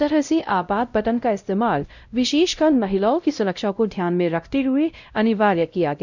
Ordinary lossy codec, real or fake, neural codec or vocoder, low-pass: none; fake; codec, 16 kHz, 0.5 kbps, X-Codec, WavLM features, trained on Multilingual LibriSpeech; 7.2 kHz